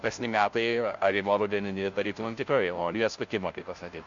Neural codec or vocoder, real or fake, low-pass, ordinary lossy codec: codec, 16 kHz, 0.5 kbps, FunCodec, trained on Chinese and English, 25 frames a second; fake; 7.2 kHz; MP3, 48 kbps